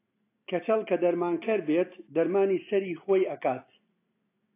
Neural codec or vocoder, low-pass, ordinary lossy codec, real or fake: none; 3.6 kHz; AAC, 24 kbps; real